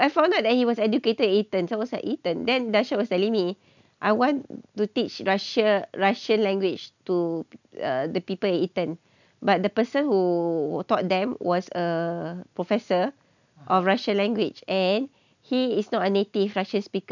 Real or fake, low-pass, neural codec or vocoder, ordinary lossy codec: real; 7.2 kHz; none; none